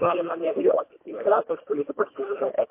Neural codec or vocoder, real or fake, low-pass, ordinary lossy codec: codec, 24 kHz, 1.5 kbps, HILCodec; fake; 3.6 kHz; MP3, 24 kbps